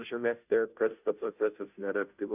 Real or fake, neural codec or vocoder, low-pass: fake; codec, 16 kHz, 0.5 kbps, FunCodec, trained on Chinese and English, 25 frames a second; 3.6 kHz